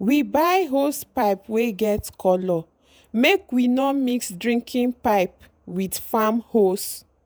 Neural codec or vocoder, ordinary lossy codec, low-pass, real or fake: vocoder, 48 kHz, 128 mel bands, Vocos; none; none; fake